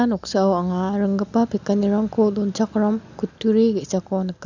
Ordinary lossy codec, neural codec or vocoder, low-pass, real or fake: none; none; 7.2 kHz; real